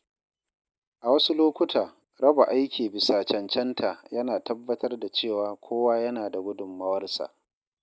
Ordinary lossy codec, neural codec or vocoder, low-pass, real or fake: none; none; none; real